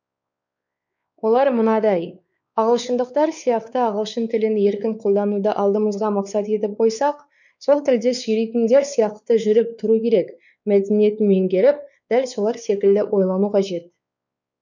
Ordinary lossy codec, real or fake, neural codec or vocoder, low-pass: none; fake; codec, 16 kHz, 4 kbps, X-Codec, WavLM features, trained on Multilingual LibriSpeech; 7.2 kHz